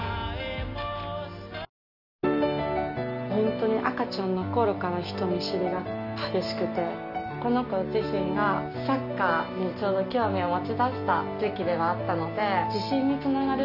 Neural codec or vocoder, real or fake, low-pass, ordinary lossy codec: none; real; 5.4 kHz; none